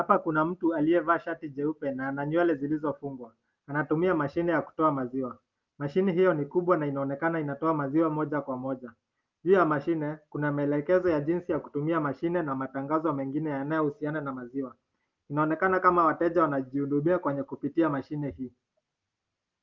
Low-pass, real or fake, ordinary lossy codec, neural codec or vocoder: 7.2 kHz; real; Opus, 32 kbps; none